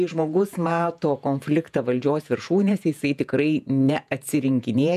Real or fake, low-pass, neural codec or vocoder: fake; 14.4 kHz; vocoder, 48 kHz, 128 mel bands, Vocos